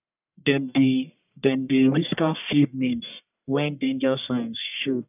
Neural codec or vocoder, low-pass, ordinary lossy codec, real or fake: codec, 44.1 kHz, 1.7 kbps, Pupu-Codec; 3.6 kHz; none; fake